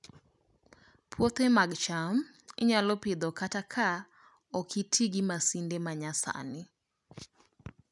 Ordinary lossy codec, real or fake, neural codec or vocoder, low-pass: none; real; none; 10.8 kHz